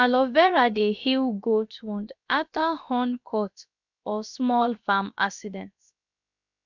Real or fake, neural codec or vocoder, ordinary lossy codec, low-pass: fake; codec, 16 kHz, about 1 kbps, DyCAST, with the encoder's durations; none; 7.2 kHz